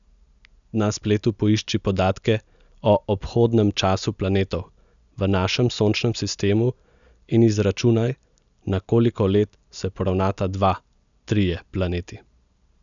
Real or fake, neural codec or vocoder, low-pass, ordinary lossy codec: real; none; 7.2 kHz; none